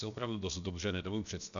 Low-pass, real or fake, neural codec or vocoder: 7.2 kHz; fake; codec, 16 kHz, about 1 kbps, DyCAST, with the encoder's durations